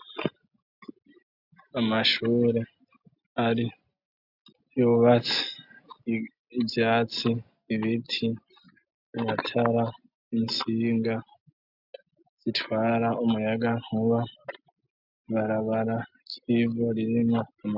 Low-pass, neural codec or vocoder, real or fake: 5.4 kHz; none; real